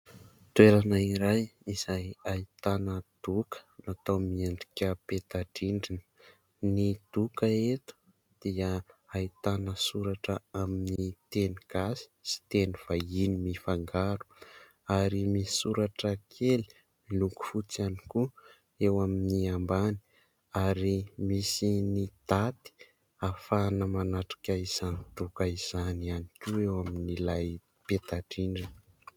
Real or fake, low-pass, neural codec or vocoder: real; 19.8 kHz; none